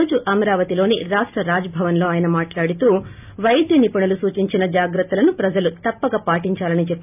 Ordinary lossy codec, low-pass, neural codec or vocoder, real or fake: none; 3.6 kHz; none; real